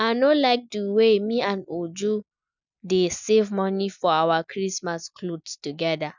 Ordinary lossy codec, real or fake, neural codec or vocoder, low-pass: none; real; none; 7.2 kHz